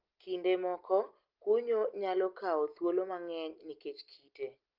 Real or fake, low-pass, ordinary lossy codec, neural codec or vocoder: real; 5.4 kHz; Opus, 24 kbps; none